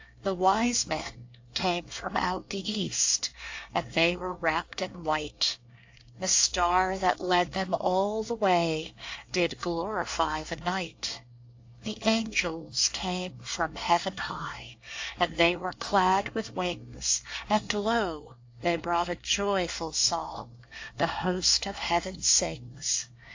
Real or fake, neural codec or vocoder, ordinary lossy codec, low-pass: fake; codec, 24 kHz, 1 kbps, SNAC; AAC, 48 kbps; 7.2 kHz